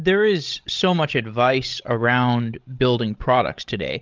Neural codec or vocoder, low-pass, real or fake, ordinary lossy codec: codec, 16 kHz, 16 kbps, FreqCodec, larger model; 7.2 kHz; fake; Opus, 32 kbps